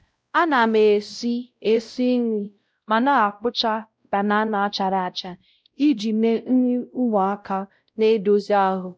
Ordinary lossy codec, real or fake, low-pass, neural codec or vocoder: none; fake; none; codec, 16 kHz, 0.5 kbps, X-Codec, WavLM features, trained on Multilingual LibriSpeech